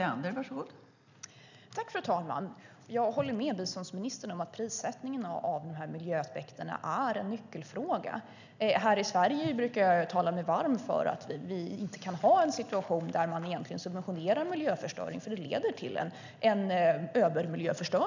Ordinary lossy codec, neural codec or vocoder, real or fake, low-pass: none; none; real; 7.2 kHz